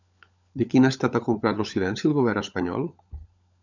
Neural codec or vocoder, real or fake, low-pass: codec, 16 kHz, 16 kbps, FunCodec, trained on LibriTTS, 50 frames a second; fake; 7.2 kHz